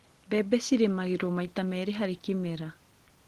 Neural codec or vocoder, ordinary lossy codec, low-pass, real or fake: none; Opus, 16 kbps; 14.4 kHz; real